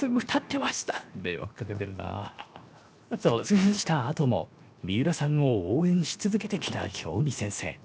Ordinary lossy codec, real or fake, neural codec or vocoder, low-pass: none; fake; codec, 16 kHz, 0.7 kbps, FocalCodec; none